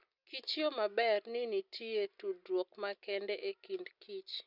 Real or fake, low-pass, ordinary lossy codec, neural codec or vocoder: real; 5.4 kHz; none; none